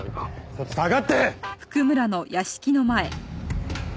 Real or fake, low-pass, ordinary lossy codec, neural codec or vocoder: real; none; none; none